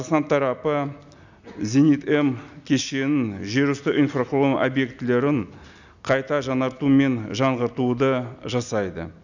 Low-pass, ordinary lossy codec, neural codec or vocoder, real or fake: 7.2 kHz; none; none; real